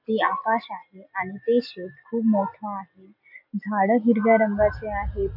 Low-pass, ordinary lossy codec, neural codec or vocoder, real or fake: 5.4 kHz; MP3, 48 kbps; none; real